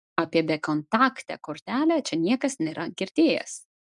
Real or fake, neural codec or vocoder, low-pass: real; none; 10.8 kHz